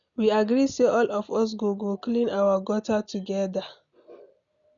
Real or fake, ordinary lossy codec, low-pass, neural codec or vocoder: real; none; 7.2 kHz; none